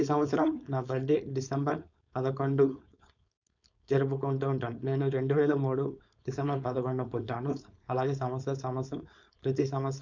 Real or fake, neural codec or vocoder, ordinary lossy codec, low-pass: fake; codec, 16 kHz, 4.8 kbps, FACodec; none; 7.2 kHz